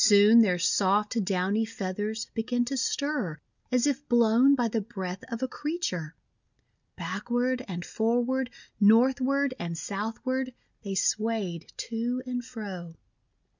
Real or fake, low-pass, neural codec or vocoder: real; 7.2 kHz; none